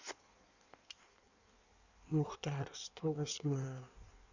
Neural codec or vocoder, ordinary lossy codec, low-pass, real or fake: codec, 16 kHz in and 24 kHz out, 1.1 kbps, FireRedTTS-2 codec; none; 7.2 kHz; fake